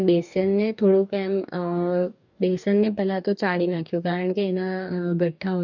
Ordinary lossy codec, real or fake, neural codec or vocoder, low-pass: none; fake; codec, 44.1 kHz, 2.6 kbps, DAC; 7.2 kHz